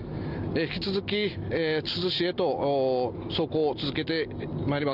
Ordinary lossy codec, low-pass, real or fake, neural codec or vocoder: none; 5.4 kHz; real; none